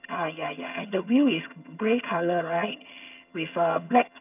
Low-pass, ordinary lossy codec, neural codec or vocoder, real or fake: 3.6 kHz; none; vocoder, 22.05 kHz, 80 mel bands, HiFi-GAN; fake